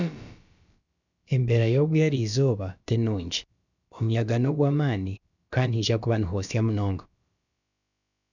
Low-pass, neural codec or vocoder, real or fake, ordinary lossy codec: 7.2 kHz; codec, 16 kHz, about 1 kbps, DyCAST, with the encoder's durations; fake; none